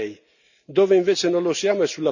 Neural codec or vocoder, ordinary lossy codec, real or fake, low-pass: none; none; real; 7.2 kHz